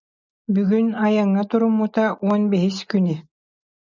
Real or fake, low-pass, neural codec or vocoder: real; 7.2 kHz; none